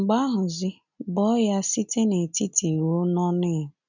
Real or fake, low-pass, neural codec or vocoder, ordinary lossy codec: real; 7.2 kHz; none; none